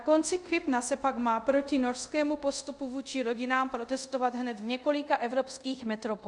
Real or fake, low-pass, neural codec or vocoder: fake; 10.8 kHz; codec, 24 kHz, 0.5 kbps, DualCodec